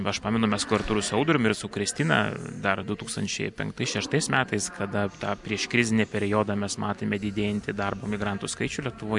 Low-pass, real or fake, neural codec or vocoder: 10.8 kHz; real; none